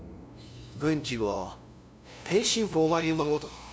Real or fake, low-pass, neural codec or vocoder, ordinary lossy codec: fake; none; codec, 16 kHz, 0.5 kbps, FunCodec, trained on LibriTTS, 25 frames a second; none